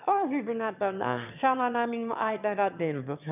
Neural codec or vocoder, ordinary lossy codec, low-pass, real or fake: autoencoder, 22.05 kHz, a latent of 192 numbers a frame, VITS, trained on one speaker; none; 3.6 kHz; fake